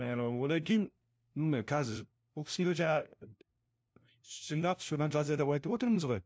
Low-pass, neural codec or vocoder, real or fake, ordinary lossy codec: none; codec, 16 kHz, 0.5 kbps, FunCodec, trained on LibriTTS, 25 frames a second; fake; none